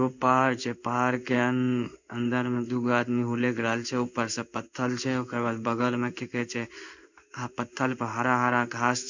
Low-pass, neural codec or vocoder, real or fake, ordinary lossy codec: 7.2 kHz; codec, 16 kHz in and 24 kHz out, 1 kbps, XY-Tokenizer; fake; none